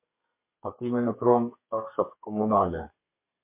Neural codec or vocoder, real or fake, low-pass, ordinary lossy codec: codec, 32 kHz, 1.9 kbps, SNAC; fake; 3.6 kHz; MP3, 24 kbps